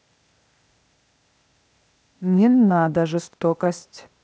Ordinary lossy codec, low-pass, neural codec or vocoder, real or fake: none; none; codec, 16 kHz, 0.8 kbps, ZipCodec; fake